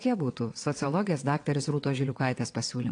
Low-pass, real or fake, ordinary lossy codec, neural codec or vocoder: 9.9 kHz; fake; AAC, 48 kbps; vocoder, 22.05 kHz, 80 mel bands, WaveNeXt